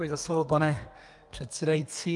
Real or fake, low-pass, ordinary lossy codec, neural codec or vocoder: fake; 10.8 kHz; Opus, 32 kbps; codec, 32 kHz, 1.9 kbps, SNAC